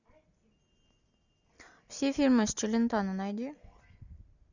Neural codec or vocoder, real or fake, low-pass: none; real; 7.2 kHz